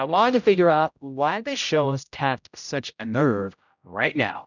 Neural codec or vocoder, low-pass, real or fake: codec, 16 kHz, 0.5 kbps, X-Codec, HuBERT features, trained on general audio; 7.2 kHz; fake